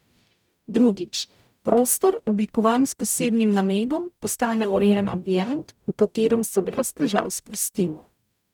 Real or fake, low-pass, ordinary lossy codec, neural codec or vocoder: fake; 19.8 kHz; none; codec, 44.1 kHz, 0.9 kbps, DAC